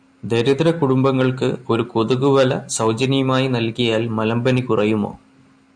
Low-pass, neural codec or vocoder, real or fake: 9.9 kHz; none; real